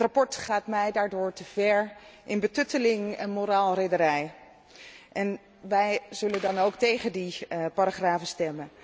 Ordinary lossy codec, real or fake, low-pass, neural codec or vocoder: none; real; none; none